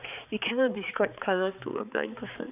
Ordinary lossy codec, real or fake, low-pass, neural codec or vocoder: none; fake; 3.6 kHz; codec, 16 kHz, 4 kbps, X-Codec, HuBERT features, trained on balanced general audio